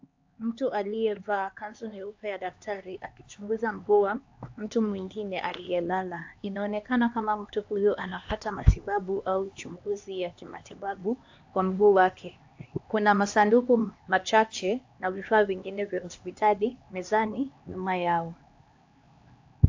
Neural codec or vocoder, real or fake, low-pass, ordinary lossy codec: codec, 16 kHz, 2 kbps, X-Codec, HuBERT features, trained on LibriSpeech; fake; 7.2 kHz; AAC, 48 kbps